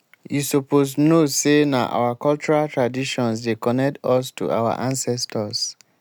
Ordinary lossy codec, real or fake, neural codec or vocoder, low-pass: none; real; none; none